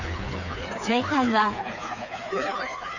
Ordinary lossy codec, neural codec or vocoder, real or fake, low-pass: none; codec, 16 kHz, 4 kbps, FreqCodec, smaller model; fake; 7.2 kHz